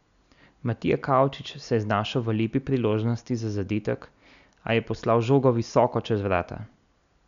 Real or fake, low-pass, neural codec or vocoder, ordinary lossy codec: real; 7.2 kHz; none; none